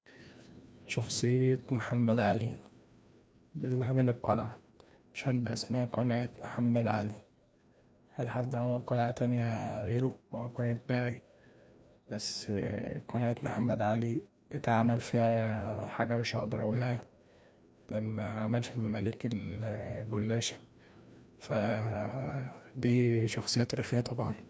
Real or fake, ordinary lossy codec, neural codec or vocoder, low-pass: fake; none; codec, 16 kHz, 1 kbps, FreqCodec, larger model; none